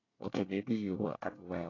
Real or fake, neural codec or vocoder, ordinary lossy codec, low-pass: fake; codec, 24 kHz, 1 kbps, SNAC; AAC, 32 kbps; 7.2 kHz